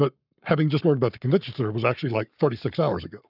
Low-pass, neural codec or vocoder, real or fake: 5.4 kHz; codec, 44.1 kHz, 7.8 kbps, Pupu-Codec; fake